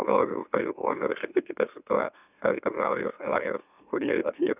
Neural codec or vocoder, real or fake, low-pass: autoencoder, 44.1 kHz, a latent of 192 numbers a frame, MeloTTS; fake; 3.6 kHz